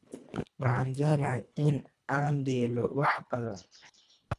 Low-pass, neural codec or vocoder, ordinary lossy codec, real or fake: none; codec, 24 kHz, 1.5 kbps, HILCodec; none; fake